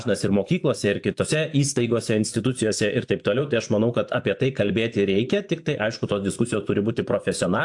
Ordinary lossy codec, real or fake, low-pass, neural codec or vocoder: AAC, 64 kbps; fake; 10.8 kHz; vocoder, 24 kHz, 100 mel bands, Vocos